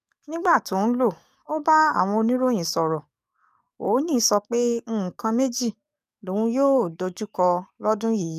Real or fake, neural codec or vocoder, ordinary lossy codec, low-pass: fake; codec, 44.1 kHz, 7.8 kbps, DAC; none; 14.4 kHz